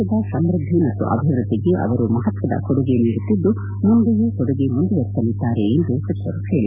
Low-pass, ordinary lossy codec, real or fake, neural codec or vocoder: 3.6 kHz; none; real; none